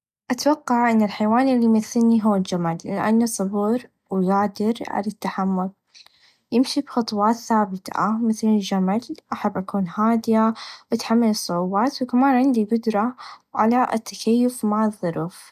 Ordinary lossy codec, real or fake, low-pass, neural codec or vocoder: none; real; 14.4 kHz; none